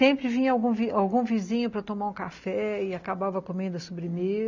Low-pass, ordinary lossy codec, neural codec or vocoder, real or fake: 7.2 kHz; none; none; real